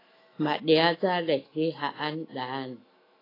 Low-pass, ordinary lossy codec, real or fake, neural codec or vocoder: 5.4 kHz; AAC, 24 kbps; fake; autoencoder, 48 kHz, 128 numbers a frame, DAC-VAE, trained on Japanese speech